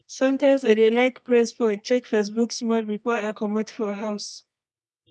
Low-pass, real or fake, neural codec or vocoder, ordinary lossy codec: none; fake; codec, 24 kHz, 0.9 kbps, WavTokenizer, medium music audio release; none